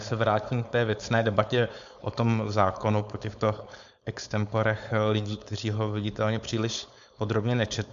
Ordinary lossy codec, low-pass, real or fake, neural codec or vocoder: AAC, 96 kbps; 7.2 kHz; fake; codec, 16 kHz, 4.8 kbps, FACodec